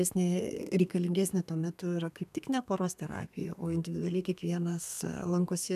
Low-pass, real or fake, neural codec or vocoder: 14.4 kHz; fake; codec, 32 kHz, 1.9 kbps, SNAC